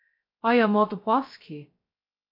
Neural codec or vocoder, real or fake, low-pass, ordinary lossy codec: codec, 16 kHz, 0.2 kbps, FocalCodec; fake; 5.4 kHz; MP3, 32 kbps